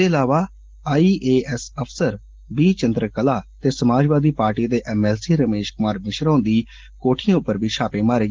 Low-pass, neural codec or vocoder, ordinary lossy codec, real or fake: 7.2 kHz; none; Opus, 16 kbps; real